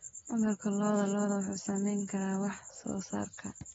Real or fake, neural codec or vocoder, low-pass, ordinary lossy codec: real; none; 19.8 kHz; AAC, 24 kbps